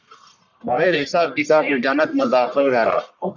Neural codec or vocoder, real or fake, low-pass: codec, 44.1 kHz, 1.7 kbps, Pupu-Codec; fake; 7.2 kHz